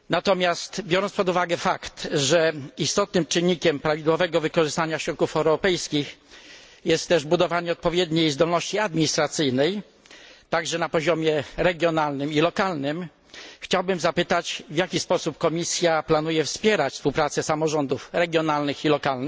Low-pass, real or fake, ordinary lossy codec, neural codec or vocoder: none; real; none; none